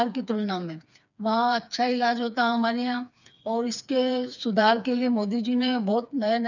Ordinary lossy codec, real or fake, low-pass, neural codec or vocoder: none; fake; 7.2 kHz; codec, 16 kHz, 4 kbps, FreqCodec, smaller model